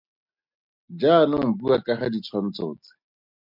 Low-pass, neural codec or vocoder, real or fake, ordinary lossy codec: 5.4 kHz; none; real; MP3, 48 kbps